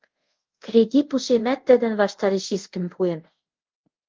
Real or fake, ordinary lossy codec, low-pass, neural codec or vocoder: fake; Opus, 32 kbps; 7.2 kHz; codec, 24 kHz, 0.5 kbps, DualCodec